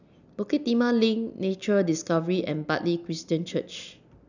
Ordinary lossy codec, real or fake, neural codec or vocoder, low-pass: none; real; none; 7.2 kHz